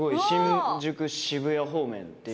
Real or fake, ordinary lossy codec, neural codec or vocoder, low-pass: real; none; none; none